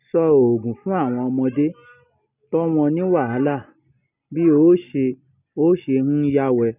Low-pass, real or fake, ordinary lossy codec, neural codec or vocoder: 3.6 kHz; real; none; none